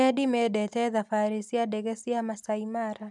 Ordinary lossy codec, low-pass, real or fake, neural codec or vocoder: none; none; real; none